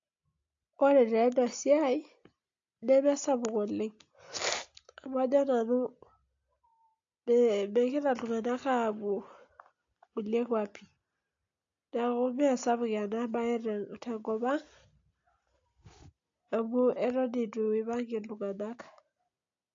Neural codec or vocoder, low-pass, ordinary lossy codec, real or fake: none; 7.2 kHz; AAC, 48 kbps; real